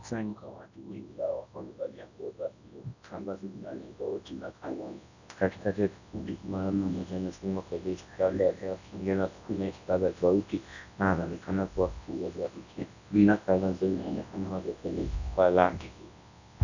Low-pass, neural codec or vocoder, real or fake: 7.2 kHz; codec, 24 kHz, 0.9 kbps, WavTokenizer, large speech release; fake